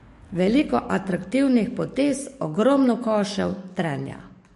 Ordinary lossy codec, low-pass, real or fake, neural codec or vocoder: MP3, 48 kbps; 14.4 kHz; fake; codec, 44.1 kHz, 7.8 kbps, DAC